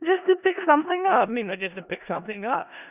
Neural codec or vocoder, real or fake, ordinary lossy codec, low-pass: codec, 16 kHz in and 24 kHz out, 0.4 kbps, LongCat-Audio-Codec, four codebook decoder; fake; none; 3.6 kHz